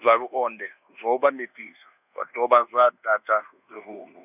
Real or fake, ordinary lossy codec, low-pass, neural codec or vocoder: fake; none; 3.6 kHz; codec, 24 kHz, 1.2 kbps, DualCodec